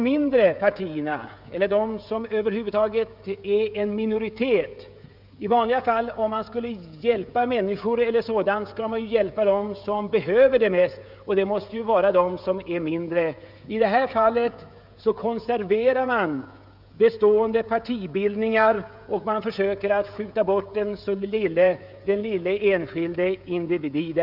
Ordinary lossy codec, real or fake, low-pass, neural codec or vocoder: none; fake; 5.4 kHz; codec, 16 kHz, 16 kbps, FreqCodec, smaller model